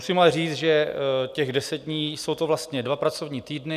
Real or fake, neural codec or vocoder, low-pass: real; none; 14.4 kHz